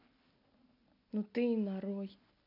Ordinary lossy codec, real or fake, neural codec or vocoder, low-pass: none; real; none; 5.4 kHz